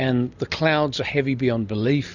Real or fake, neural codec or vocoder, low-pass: real; none; 7.2 kHz